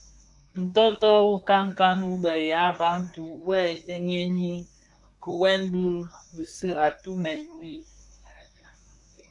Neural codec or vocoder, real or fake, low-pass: codec, 24 kHz, 1 kbps, SNAC; fake; 10.8 kHz